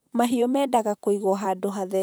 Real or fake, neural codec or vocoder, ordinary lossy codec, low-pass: fake; vocoder, 44.1 kHz, 128 mel bands, Pupu-Vocoder; none; none